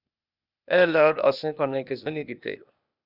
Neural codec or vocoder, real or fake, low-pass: codec, 16 kHz, 0.8 kbps, ZipCodec; fake; 5.4 kHz